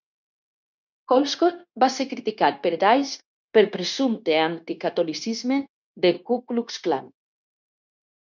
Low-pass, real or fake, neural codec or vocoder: 7.2 kHz; fake; codec, 16 kHz, 0.9 kbps, LongCat-Audio-Codec